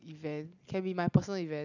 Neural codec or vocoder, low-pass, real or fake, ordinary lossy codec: none; 7.2 kHz; real; none